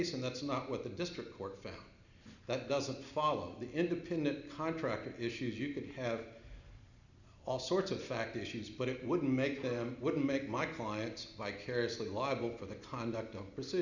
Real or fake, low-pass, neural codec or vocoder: real; 7.2 kHz; none